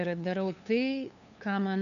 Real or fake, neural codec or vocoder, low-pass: fake; codec, 16 kHz, 2 kbps, FunCodec, trained on LibriTTS, 25 frames a second; 7.2 kHz